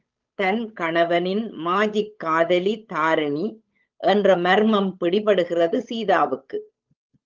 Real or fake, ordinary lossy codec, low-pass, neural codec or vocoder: fake; Opus, 24 kbps; 7.2 kHz; codec, 16 kHz, 8 kbps, FunCodec, trained on Chinese and English, 25 frames a second